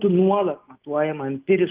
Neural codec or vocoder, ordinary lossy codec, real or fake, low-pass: none; Opus, 32 kbps; real; 3.6 kHz